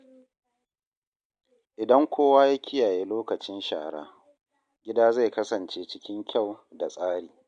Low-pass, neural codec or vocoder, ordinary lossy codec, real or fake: 9.9 kHz; none; MP3, 64 kbps; real